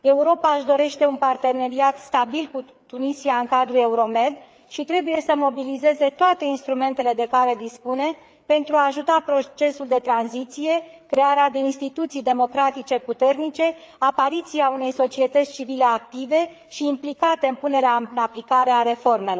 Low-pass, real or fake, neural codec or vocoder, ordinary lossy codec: none; fake; codec, 16 kHz, 4 kbps, FreqCodec, larger model; none